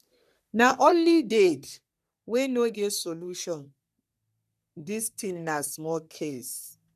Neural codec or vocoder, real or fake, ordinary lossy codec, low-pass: codec, 44.1 kHz, 3.4 kbps, Pupu-Codec; fake; none; 14.4 kHz